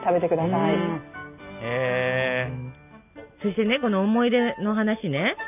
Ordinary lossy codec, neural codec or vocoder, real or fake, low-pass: none; none; real; 3.6 kHz